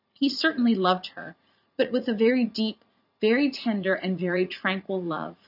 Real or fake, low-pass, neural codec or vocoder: fake; 5.4 kHz; vocoder, 44.1 kHz, 80 mel bands, Vocos